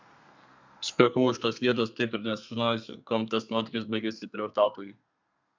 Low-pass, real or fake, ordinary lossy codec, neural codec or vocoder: 7.2 kHz; fake; MP3, 64 kbps; codec, 32 kHz, 1.9 kbps, SNAC